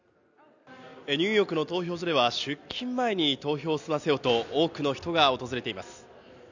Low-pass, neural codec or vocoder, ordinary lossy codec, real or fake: 7.2 kHz; none; none; real